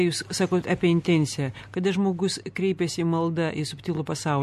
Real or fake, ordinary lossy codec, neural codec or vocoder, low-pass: real; MP3, 64 kbps; none; 14.4 kHz